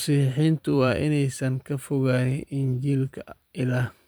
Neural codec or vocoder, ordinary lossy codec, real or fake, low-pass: vocoder, 44.1 kHz, 128 mel bands every 512 samples, BigVGAN v2; none; fake; none